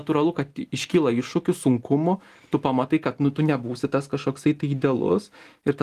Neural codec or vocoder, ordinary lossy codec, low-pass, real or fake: none; Opus, 24 kbps; 14.4 kHz; real